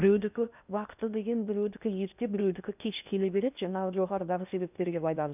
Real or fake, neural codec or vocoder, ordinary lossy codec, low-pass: fake; codec, 16 kHz in and 24 kHz out, 0.8 kbps, FocalCodec, streaming, 65536 codes; none; 3.6 kHz